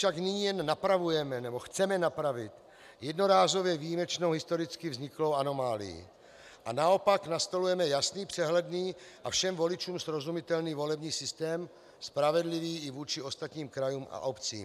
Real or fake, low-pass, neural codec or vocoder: real; 14.4 kHz; none